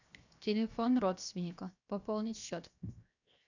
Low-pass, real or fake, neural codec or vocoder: 7.2 kHz; fake; codec, 16 kHz, 0.7 kbps, FocalCodec